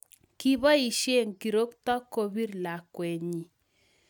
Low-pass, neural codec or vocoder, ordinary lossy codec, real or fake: none; none; none; real